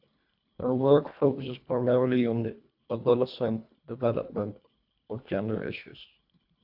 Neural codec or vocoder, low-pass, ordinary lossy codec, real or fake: codec, 24 kHz, 1.5 kbps, HILCodec; 5.4 kHz; AAC, 48 kbps; fake